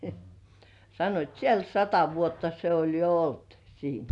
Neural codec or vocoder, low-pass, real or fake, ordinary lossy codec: none; 10.8 kHz; real; none